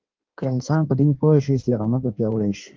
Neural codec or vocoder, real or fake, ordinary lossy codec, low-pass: codec, 16 kHz in and 24 kHz out, 1.1 kbps, FireRedTTS-2 codec; fake; Opus, 32 kbps; 7.2 kHz